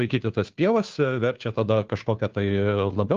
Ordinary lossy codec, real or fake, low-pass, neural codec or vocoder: Opus, 32 kbps; fake; 7.2 kHz; codec, 16 kHz, 2 kbps, FunCodec, trained on Chinese and English, 25 frames a second